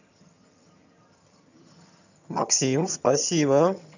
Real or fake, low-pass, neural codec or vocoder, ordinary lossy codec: fake; 7.2 kHz; vocoder, 22.05 kHz, 80 mel bands, HiFi-GAN; none